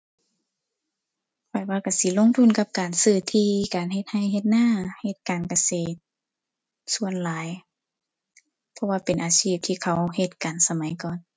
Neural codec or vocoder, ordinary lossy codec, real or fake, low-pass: none; none; real; none